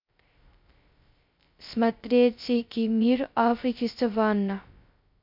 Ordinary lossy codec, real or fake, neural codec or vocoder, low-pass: MP3, 48 kbps; fake; codec, 16 kHz, 0.2 kbps, FocalCodec; 5.4 kHz